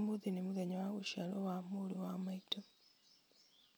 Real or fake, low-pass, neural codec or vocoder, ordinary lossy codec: real; none; none; none